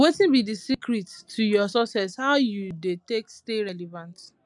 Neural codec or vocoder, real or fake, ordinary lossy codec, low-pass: none; real; none; 10.8 kHz